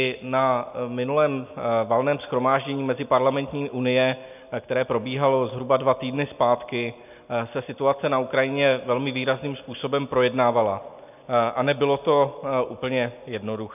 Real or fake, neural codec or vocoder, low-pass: real; none; 3.6 kHz